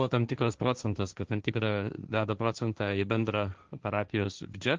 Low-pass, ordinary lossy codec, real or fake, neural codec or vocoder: 7.2 kHz; Opus, 32 kbps; fake; codec, 16 kHz, 1.1 kbps, Voila-Tokenizer